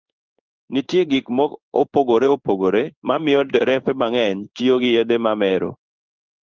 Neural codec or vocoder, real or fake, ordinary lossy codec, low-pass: codec, 16 kHz in and 24 kHz out, 1 kbps, XY-Tokenizer; fake; Opus, 24 kbps; 7.2 kHz